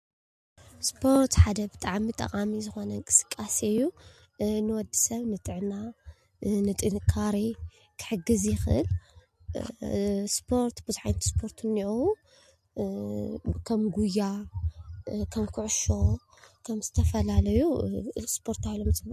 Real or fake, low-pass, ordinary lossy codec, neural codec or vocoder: real; 14.4 kHz; MP3, 64 kbps; none